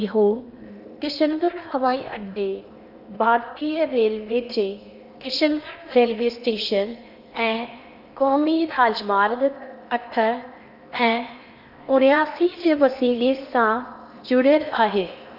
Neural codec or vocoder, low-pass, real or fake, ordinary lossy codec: codec, 16 kHz in and 24 kHz out, 0.8 kbps, FocalCodec, streaming, 65536 codes; 5.4 kHz; fake; none